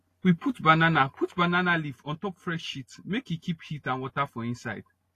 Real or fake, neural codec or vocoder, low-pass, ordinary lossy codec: real; none; 14.4 kHz; AAC, 48 kbps